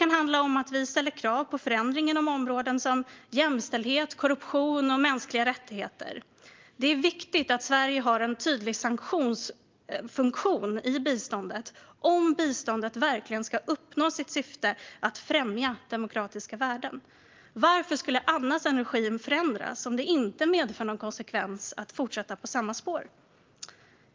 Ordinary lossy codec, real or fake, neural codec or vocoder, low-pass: Opus, 32 kbps; real; none; 7.2 kHz